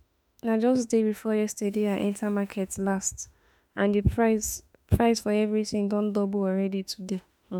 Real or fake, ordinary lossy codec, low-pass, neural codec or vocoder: fake; none; none; autoencoder, 48 kHz, 32 numbers a frame, DAC-VAE, trained on Japanese speech